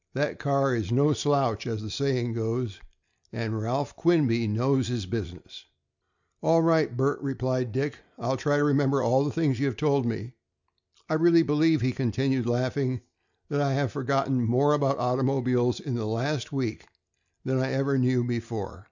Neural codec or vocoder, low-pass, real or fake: none; 7.2 kHz; real